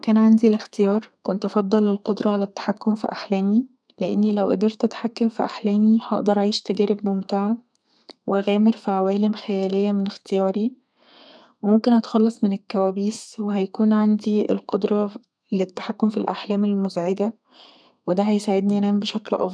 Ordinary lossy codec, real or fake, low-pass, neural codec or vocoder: none; fake; 9.9 kHz; codec, 32 kHz, 1.9 kbps, SNAC